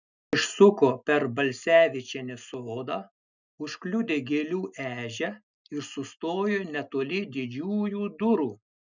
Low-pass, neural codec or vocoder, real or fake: 7.2 kHz; none; real